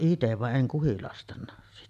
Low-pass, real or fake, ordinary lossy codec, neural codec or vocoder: 14.4 kHz; real; none; none